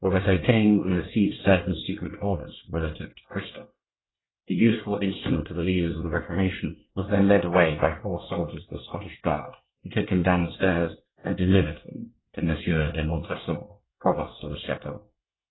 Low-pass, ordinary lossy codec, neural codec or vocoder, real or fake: 7.2 kHz; AAC, 16 kbps; codec, 24 kHz, 1 kbps, SNAC; fake